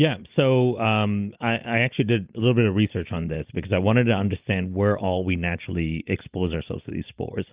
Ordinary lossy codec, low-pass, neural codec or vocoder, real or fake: Opus, 32 kbps; 3.6 kHz; none; real